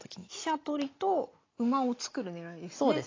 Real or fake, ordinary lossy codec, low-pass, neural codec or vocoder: real; AAC, 32 kbps; 7.2 kHz; none